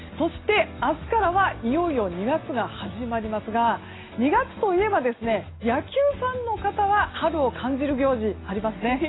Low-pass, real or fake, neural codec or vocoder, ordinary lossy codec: 7.2 kHz; real; none; AAC, 16 kbps